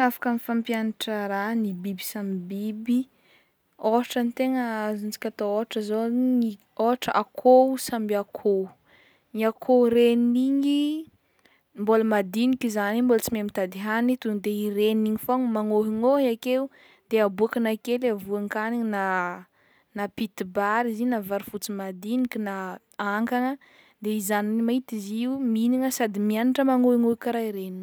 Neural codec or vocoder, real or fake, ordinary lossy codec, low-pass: none; real; none; none